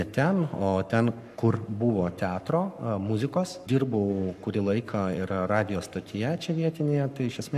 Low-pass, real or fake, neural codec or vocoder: 14.4 kHz; fake; codec, 44.1 kHz, 7.8 kbps, Pupu-Codec